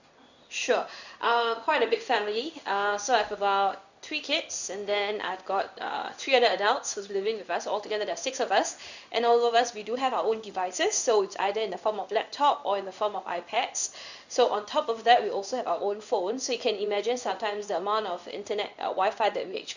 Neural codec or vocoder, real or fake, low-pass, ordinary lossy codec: codec, 16 kHz in and 24 kHz out, 1 kbps, XY-Tokenizer; fake; 7.2 kHz; none